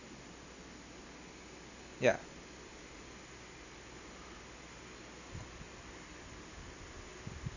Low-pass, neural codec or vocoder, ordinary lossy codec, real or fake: 7.2 kHz; none; none; real